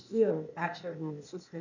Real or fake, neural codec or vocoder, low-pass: fake; codec, 16 kHz, 0.8 kbps, ZipCodec; 7.2 kHz